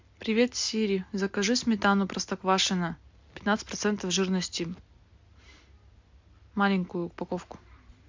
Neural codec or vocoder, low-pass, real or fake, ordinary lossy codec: none; 7.2 kHz; real; MP3, 64 kbps